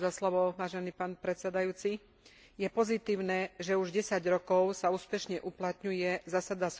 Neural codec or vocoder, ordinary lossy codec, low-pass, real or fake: none; none; none; real